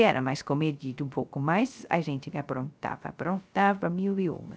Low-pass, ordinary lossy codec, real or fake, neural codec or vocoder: none; none; fake; codec, 16 kHz, 0.3 kbps, FocalCodec